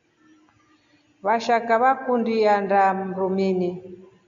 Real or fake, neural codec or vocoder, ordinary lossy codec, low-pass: real; none; AAC, 64 kbps; 7.2 kHz